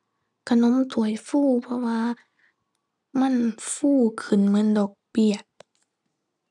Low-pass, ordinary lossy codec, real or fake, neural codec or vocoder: none; none; real; none